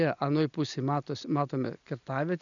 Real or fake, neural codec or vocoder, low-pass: real; none; 7.2 kHz